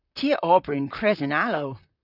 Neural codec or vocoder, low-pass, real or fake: vocoder, 44.1 kHz, 128 mel bands, Pupu-Vocoder; 5.4 kHz; fake